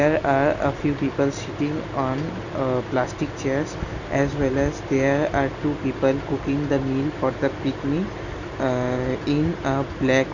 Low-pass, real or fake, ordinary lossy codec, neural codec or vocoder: 7.2 kHz; real; none; none